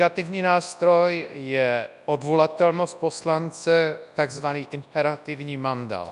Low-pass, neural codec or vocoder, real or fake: 10.8 kHz; codec, 24 kHz, 0.9 kbps, WavTokenizer, large speech release; fake